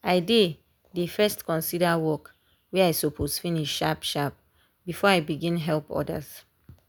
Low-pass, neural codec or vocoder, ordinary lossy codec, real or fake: none; none; none; real